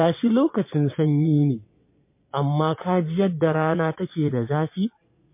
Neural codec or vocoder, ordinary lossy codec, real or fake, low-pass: vocoder, 22.05 kHz, 80 mel bands, Vocos; MP3, 24 kbps; fake; 3.6 kHz